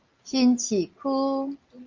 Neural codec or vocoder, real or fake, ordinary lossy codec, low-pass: none; real; Opus, 32 kbps; 7.2 kHz